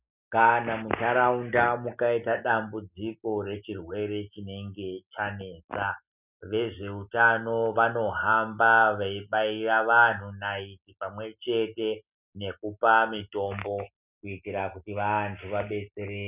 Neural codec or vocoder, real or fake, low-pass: none; real; 3.6 kHz